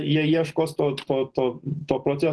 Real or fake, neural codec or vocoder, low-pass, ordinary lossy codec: real; none; 10.8 kHz; Opus, 16 kbps